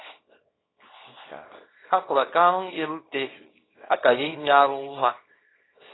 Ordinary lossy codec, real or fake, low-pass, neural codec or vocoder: AAC, 16 kbps; fake; 7.2 kHz; codec, 24 kHz, 0.9 kbps, WavTokenizer, small release